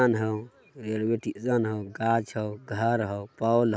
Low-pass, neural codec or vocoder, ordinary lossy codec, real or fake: none; none; none; real